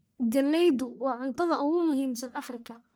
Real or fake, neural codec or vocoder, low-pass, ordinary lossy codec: fake; codec, 44.1 kHz, 1.7 kbps, Pupu-Codec; none; none